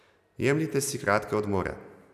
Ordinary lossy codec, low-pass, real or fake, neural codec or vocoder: none; 14.4 kHz; real; none